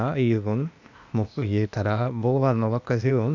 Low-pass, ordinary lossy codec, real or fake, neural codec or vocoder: 7.2 kHz; none; fake; codec, 16 kHz, 0.8 kbps, ZipCodec